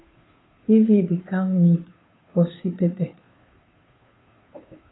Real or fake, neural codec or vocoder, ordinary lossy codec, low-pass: fake; codec, 16 kHz, 2 kbps, FunCodec, trained on Chinese and English, 25 frames a second; AAC, 16 kbps; 7.2 kHz